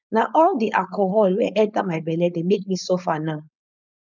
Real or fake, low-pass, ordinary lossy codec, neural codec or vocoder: fake; 7.2 kHz; none; codec, 16 kHz, 4.8 kbps, FACodec